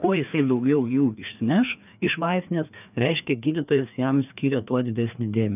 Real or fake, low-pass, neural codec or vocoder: fake; 3.6 kHz; codec, 16 kHz, 2 kbps, FreqCodec, larger model